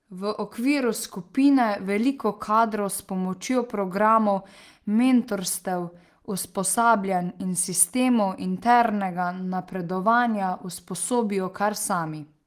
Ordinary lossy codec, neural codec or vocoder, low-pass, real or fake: Opus, 24 kbps; none; 14.4 kHz; real